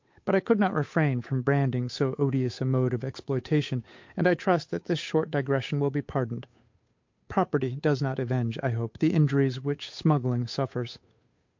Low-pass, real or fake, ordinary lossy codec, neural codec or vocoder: 7.2 kHz; fake; MP3, 48 kbps; codec, 16 kHz, 8 kbps, FunCodec, trained on Chinese and English, 25 frames a second